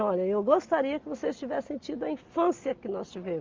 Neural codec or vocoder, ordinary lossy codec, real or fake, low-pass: none; Opus, 24 kbps; real; 7.2 kHz